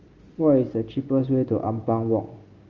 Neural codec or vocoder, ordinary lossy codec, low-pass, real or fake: none; Opus, 32 kbps; 7.2 kHz; real